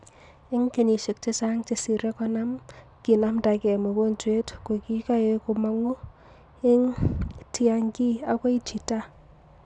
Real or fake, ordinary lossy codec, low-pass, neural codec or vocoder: real; none; 10.8 kHz; none